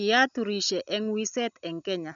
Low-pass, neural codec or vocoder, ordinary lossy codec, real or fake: 7.2 kHz; none; none; real